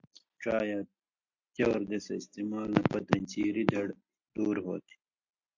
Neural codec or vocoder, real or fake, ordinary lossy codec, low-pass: none; real; MP3, 48 kbps; 7.2 kHz